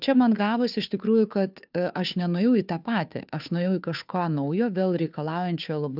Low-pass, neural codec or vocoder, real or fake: 5.4 kHz; codec, 16 kHz, 2 kbps, FunCodec, trained on Chinese and English, 25 frames a second; fake